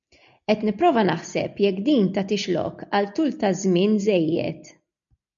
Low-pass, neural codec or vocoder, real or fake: 7.2 kHz; none; real